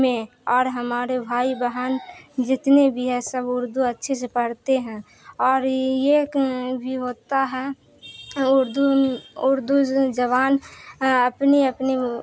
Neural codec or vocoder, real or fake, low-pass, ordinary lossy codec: none; real; none; none